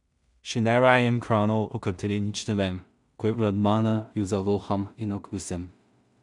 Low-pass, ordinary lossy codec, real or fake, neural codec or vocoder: 10.8 kHz; none; fake; codec, 16 kHz in and 24 kHz out, 0.4 kbps, LongCat-Audio-Codec, two codebook decoder